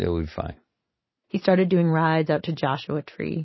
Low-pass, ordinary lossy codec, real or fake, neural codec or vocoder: 7.2 kHz; MP3, 24 kbps; real; none